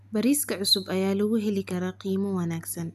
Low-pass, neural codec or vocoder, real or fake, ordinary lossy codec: 14.4 kHz; none; real; none